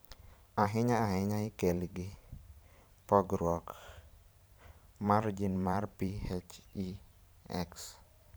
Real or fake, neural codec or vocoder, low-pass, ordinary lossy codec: fake; vocoder, 44.1 kHz, 128 mel bands every 512 samples, BigVGAN v2; none; none